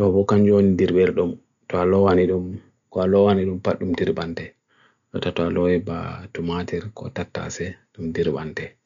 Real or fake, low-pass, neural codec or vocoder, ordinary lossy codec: real; 7.2 kHz; none; none